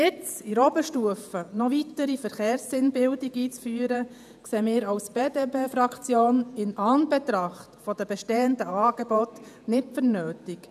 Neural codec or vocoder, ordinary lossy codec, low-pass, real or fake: vocoder, 48 kHz, 128 mel bands, Vocos; none; 14.4 kHz; fake